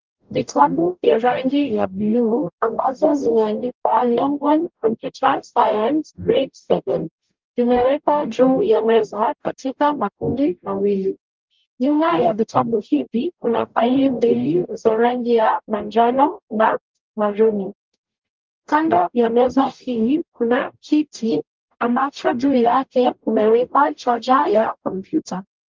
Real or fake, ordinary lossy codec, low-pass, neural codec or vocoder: fake; Opus, 32 kbps; 7.2 kHz; codec, 44.1 kHz, 0.9 kbps, DAC